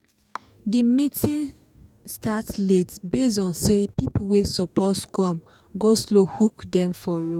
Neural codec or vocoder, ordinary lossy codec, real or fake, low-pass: codec, 44.1 kHz, 2.6 kbps, DAC; none; fake; 19.8 kHz